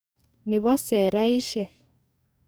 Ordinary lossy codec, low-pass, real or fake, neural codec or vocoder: none; none; fake; codec, 44.1 kHz, 2.6 kbps, DAC